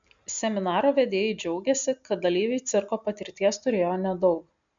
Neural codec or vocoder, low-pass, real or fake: none; 7.2 kHz; real